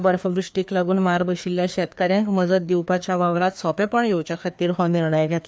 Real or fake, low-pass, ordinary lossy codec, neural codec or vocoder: fake; none; none; codec, 16 kHz, 2 kbps, FreqCodec, larger model